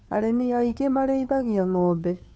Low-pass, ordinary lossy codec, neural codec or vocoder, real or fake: none; none; codec, 16 kHz, 2 kbps, FunCodec, trained on Chinese and English, 25 frames a second; fake